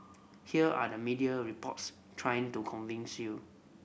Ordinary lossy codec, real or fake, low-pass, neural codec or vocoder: none; real; none; none